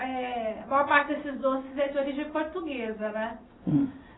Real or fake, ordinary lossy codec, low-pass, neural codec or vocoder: real; AAC, 16 kbps; 7.2 kHz; none